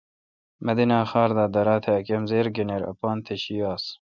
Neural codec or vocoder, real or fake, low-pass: none; real; 7.2 kHz